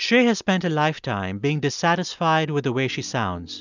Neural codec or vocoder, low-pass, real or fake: none; 7.2 kHz; real